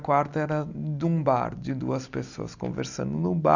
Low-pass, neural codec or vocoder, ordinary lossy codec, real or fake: 7.2 kHz; none; none; real